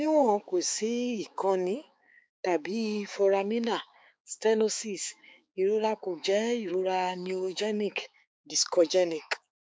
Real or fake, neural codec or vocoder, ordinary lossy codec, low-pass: fake; codec, 16 kHz, 4 kbps, X-Codec, HuBERT features, trained on balanced general audio; none; none